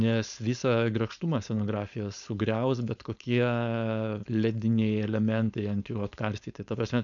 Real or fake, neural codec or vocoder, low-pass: fake; codec, 16 kHz, 4.8 kbps, FACodec; 7.2 kHz